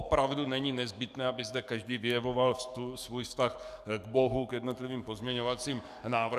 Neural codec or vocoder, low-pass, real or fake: codec, 44.1 kHz, 7.8 kbps, DAC; 14.4 kHz; fake